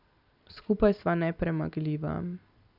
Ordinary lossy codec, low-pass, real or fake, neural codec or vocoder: none; 5.4 kHz; real; none